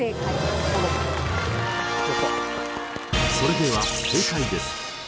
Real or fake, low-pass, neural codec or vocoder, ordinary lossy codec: real; none; none; none